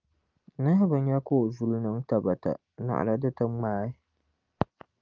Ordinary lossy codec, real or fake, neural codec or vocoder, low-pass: Opus, 32 kbps; real; none; 7.2 kHz